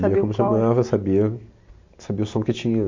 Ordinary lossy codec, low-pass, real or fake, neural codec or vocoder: MP3, 48 kbps; 7.2 kHz; real; none